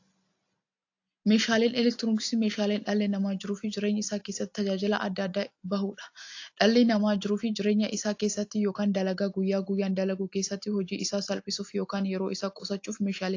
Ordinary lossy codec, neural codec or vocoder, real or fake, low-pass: AAC, 48 kbps; none; real; 7.2 kHz